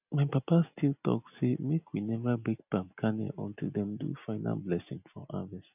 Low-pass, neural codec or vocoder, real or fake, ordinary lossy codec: 3.6 kHz; none; real; none